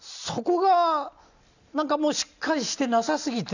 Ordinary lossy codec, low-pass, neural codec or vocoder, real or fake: none; 7.2 kHz; none; real